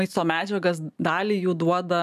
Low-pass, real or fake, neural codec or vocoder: 14.4 kHz; real; none